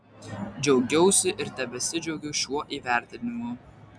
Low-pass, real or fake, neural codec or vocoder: 9.9 kHz; real; none